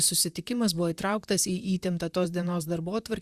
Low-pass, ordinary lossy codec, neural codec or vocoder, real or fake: 14.4 kHz; Opus, 64 kbps; vocoder, 44.1 kHz, 128 mel bands, Pupu-Vocoder; fake